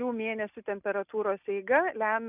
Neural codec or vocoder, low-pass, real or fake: none; 3.6 kHz; real